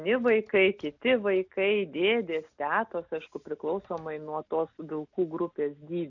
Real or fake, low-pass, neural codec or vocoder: real; 7.2 kHz; none